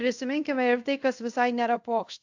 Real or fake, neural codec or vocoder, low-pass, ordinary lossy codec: fake; codec, 24 kHz, 0.5 kbps, DualCodec; 7.2 kHz; AAC, 48 kbps